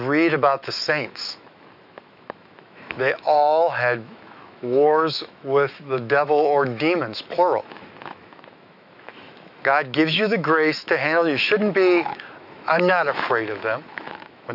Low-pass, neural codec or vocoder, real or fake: 5.4 kHz; autoencoder, 48 kHz, 128 numbers a frame, DAC-VAE, trained on Japanese speech; fake